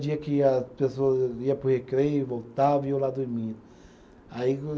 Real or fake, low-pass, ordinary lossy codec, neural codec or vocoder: real; none; none; none